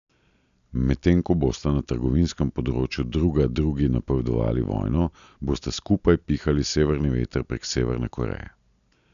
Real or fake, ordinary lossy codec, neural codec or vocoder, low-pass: real; none; none; 7.2 kHz